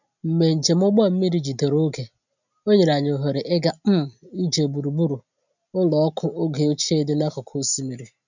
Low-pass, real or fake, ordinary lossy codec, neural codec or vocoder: 7.2 kHz; real; none; none